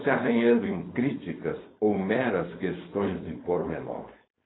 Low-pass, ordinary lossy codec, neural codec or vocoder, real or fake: 7.2 kHz; AAC, 16 kbps; codec, 16 kHz, 4.8 kbps, FACodec; fake